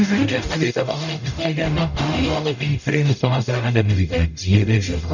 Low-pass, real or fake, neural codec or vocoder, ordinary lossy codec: 7.2 kHz; fake; codec, 44.1 kHz, 0.9 kbps, DAC; none